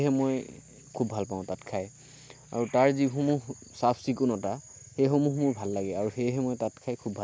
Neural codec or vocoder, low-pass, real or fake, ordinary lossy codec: none; none; real; none